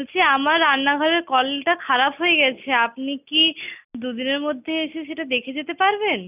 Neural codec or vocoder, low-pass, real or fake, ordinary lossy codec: none; 3.6 kHz; real; none